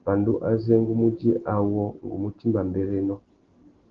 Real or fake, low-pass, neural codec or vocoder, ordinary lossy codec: real; 7.2 kHz; none; Opus, 16 kbps